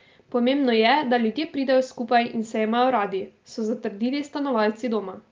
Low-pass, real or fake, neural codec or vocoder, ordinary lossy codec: 7.2 kHz; real; none; Opus, 32 kbps